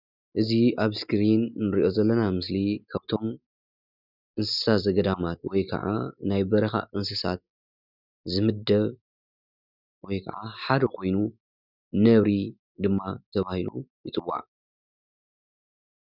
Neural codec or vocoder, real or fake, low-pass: none; real; 5.4 kHz